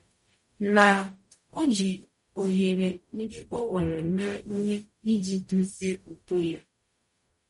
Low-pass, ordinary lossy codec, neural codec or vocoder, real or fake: 19.8 kHz; MP3, 48 kbps; codec, 44.1 kHz, 0.9 kbps, DAC; fake